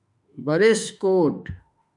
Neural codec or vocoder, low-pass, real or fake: autoencoder, 48 kHz, 32 numbers a frame, DAC-VAE, trained on Japanese speech; 10.8 kHz; fake